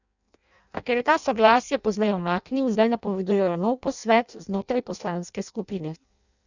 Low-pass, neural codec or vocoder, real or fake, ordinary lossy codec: 7.2 kHz; codec, 16 kHz in and 24 kHz out, 0.6 kbps, FireRedTTS-2 codec; fake; none